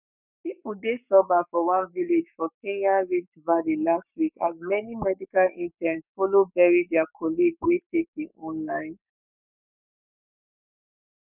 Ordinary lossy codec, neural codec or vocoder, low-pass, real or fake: none; codec, 44.1 kHz, 7.8 kbps, Pupu-Codec; 3.6 kHz; fake